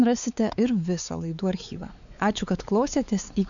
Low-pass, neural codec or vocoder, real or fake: 7.2 kHz; codec, 16 kHz, 4 kbps, X-Codec, WavLM features, trained on Multilingual LibriSpeech; fake